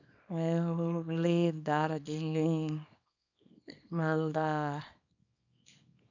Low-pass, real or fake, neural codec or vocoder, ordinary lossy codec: 7.2 kHz; fake; codec, 24 kHz, 0.9 kbps, WavTokenizer, small release; none